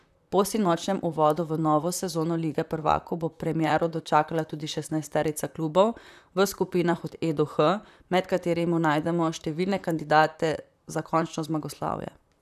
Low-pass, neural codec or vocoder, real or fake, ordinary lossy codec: 14.4 kHz; vocoder, 44.1 kHz, 128 mel bands, Pupu-Vocoder; fake; none